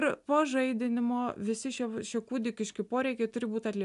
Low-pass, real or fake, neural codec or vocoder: 10.8 kHz; real; none